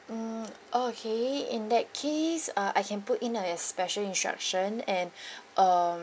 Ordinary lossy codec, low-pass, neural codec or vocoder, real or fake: none; none; none; real